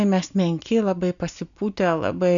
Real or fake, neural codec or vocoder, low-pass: real; none; 7.2 kHz